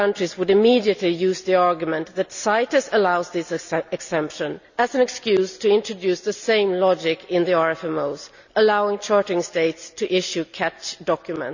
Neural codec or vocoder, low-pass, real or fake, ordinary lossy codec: none; 7.2 kHz; real; none